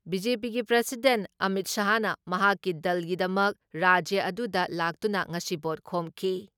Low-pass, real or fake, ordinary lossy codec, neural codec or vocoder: 19.8 kHz; real; none; none